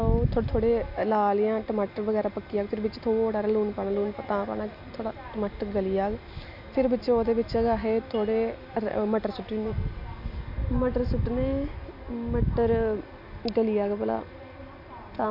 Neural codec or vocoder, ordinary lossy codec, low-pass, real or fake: none; none; 5.4 kHz; real